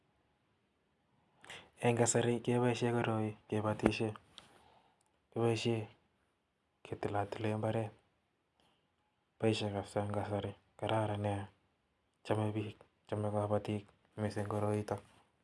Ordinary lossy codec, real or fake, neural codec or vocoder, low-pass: none; real; none; none